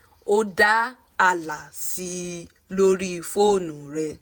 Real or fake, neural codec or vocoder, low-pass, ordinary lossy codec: fake; vocoder, 48 kHz, 128 mel bands, Vocos; none; none